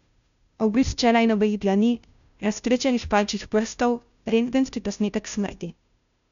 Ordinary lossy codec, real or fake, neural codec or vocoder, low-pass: none; fake; codec, 16 kHz, 0.5 kbps, FunCodec, trained on Chinese and English, 25 frames a second; 7.2 kHz